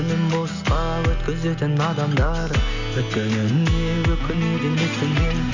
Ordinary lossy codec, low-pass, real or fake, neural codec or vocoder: none; 7.2 kHz; real; none